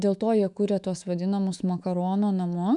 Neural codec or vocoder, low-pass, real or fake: autoencoder, 48 kHz, 128 numbers a frame, DAC-VAE, trained on Japanese speech; 10.8 kHz; fake